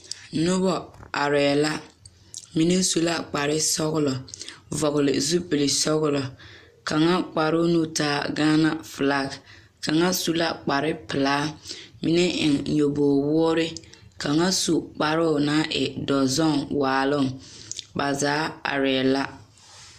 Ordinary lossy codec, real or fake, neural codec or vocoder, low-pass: Opus, 64 kbps; real; none; 14.4 kHz